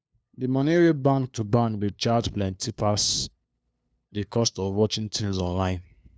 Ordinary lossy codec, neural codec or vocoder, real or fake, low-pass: none; codec, 16 kHz, 2 kbps, FunCodec, trained on LibriTTS, 25 frames a second; fake; none